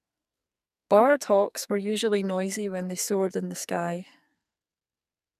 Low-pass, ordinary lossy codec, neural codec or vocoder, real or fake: 14.4 kHz; none; codec, 44.1 kHz, 2.6 kbps, SNAC; fake